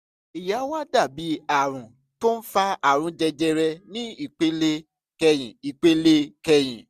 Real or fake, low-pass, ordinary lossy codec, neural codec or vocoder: real; 14.4 kHz; none; none